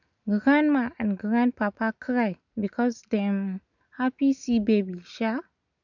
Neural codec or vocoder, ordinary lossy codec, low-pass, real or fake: none; none; 7.2 kHz; real